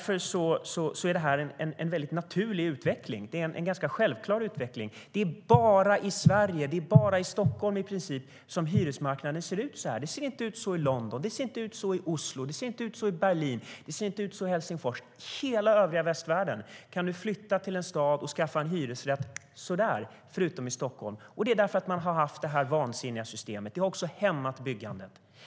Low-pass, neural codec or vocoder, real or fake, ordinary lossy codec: none; none; real; none